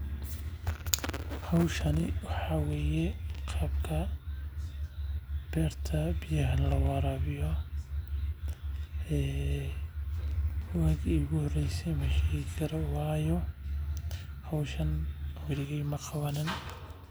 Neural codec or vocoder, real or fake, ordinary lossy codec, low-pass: none; real; none; none